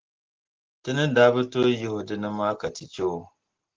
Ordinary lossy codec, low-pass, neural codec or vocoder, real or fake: Opus, 16 kbps; 7.2 kHz; none; real